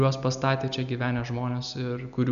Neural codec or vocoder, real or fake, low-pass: none; real; 7.2 kHz